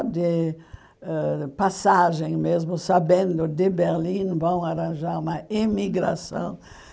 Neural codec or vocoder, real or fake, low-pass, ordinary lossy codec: none; real; none; none